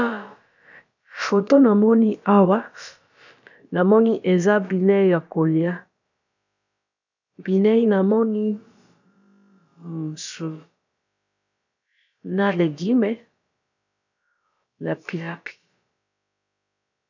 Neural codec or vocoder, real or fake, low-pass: codec, 16 kHz, about 1 kbps, DyCAST, with the encoder's durations; fake; 7.2 kHz